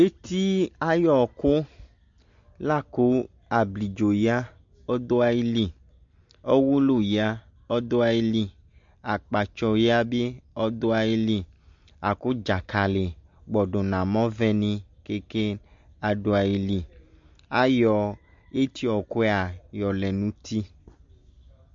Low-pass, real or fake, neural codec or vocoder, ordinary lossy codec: 7.2 kHz; real; none; MP3, 48 kbps